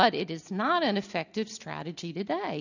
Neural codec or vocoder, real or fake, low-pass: none; real; 7.2 kHz